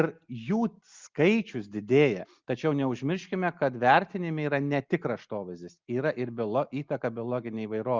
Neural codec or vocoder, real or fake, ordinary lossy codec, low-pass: none; real; Opus, 32 kbps; 7.2 kHz